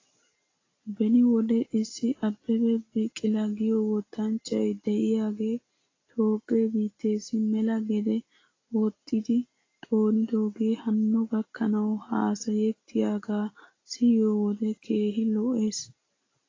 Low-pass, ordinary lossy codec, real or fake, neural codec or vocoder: 7.2 kHz; AAC, 32 kbps; real; none